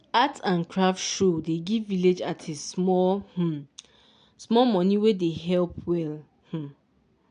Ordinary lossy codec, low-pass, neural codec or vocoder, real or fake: none; 9.9 kHz; none; real